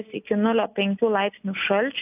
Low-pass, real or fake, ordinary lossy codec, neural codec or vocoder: 3.6 kHz; real; AAC, 32 kbps; none